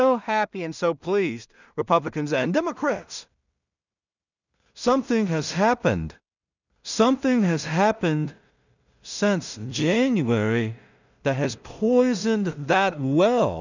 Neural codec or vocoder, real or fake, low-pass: codec, 16 kHz in and 24 kHz out, 0.4 kbps, LongCat-Audio-Codec, two codebook decoder; fake; 7.2 kHz